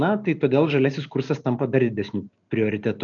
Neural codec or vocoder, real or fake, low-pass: none; real; 7.2 kHz